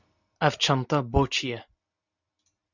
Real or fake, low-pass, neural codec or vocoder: real; 7.2 kHz; none